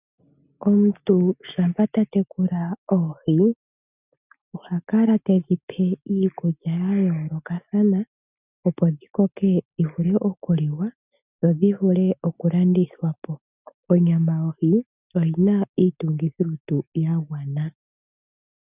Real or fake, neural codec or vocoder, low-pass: real; none; 3.6 kHz